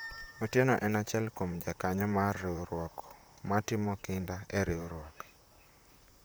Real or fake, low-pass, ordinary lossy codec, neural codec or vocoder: fake; none; none; vocoder, 44.1 kHz, 128 mel bands, Pupu-Vocoder